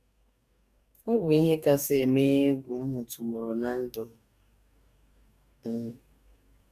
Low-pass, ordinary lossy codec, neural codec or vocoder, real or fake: 14.4 kHz; none; codec, 44.1 kHz, 2.6 kbps, DAC; fake